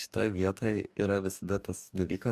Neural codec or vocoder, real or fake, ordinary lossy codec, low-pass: codec, 44.1 kHz, 2.6 kbps, DAC; fake; Opus, 64 kbps; 14.4 kHz